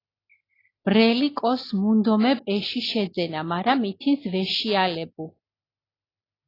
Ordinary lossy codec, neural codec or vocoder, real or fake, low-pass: AAC, 24 kbps; none; real; 5.4 kHz